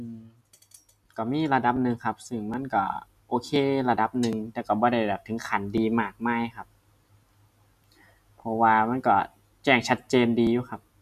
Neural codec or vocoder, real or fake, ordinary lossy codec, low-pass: none; real; none; 14.4 kHz